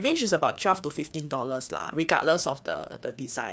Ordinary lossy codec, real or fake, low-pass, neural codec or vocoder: none; fake; none; codec, 16 kHz, 2 kbps, FreqCodec, larger model